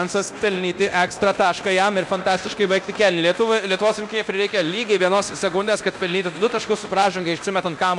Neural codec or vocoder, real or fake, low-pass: codec, 24 kHz, 0.9 kbps, DualCodec; fake; 10.8 kHz